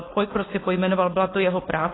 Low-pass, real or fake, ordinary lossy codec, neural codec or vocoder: 7.2 kHz; fake; AAC, 16 kbps; codec, 16 kHz, 4.8 kbps, FACodec